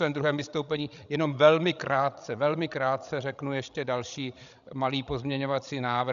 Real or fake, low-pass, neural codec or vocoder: fake; 7.2 kHz; codec, 16 kHz, 16 kbps, FreqCodec, larger model